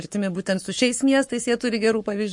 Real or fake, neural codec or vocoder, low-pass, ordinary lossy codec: fake; codec, 44.1 kHz, 7.8 kbps, Pupu-Codec; 10.8 kHz; MP3, 48 kbps